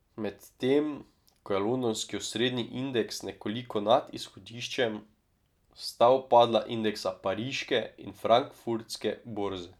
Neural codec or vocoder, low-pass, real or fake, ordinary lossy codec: none; 19.8 kHz; real; none